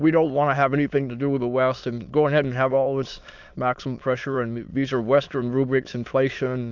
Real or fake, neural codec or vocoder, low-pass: fake; autoencoder, 22.05 kHz, a latent of 192 numbers a frame, VITS, trained on many speakers; 7.2 kHz